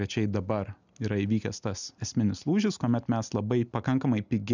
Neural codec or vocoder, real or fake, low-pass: none; real; 7.2 kHz